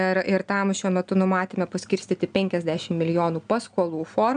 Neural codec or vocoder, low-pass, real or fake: none; 9.9 kHz; real